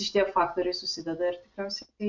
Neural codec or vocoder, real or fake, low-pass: none; real; 7.2 kHz